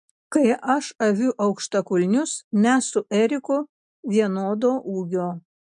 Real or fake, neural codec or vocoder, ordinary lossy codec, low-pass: real; none; MP3, 64 kbps; 10.8 kHz